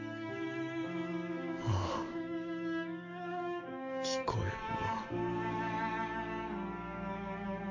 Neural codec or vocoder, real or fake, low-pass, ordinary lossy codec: codec, 44.1 kHz, 7.8 kbps, DAC; fake; 7.2 kHz; AAC, 48 kbps